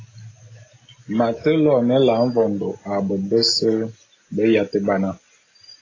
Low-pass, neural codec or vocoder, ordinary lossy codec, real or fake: 7.2 kHz; none; AAC, 32 kbps; real